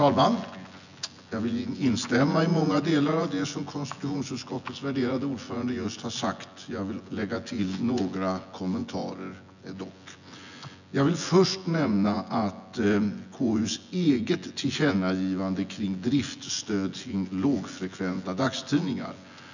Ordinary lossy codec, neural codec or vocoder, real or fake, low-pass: none; vocoder, 24 kHz, 100 mel bands, Vocos; fake; 7.2 kHz